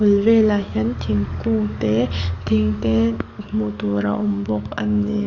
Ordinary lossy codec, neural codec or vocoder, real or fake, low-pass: none; codec, 44.1 kHz, 7.8 kbps, DAC; fake; 7.2 kHz